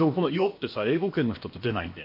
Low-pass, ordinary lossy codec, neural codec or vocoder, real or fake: 5.4 kHz; MP3, 32 kbps; codec, 16 kHz, about 1 kbps, DyCAST, with the encoder's durations; fake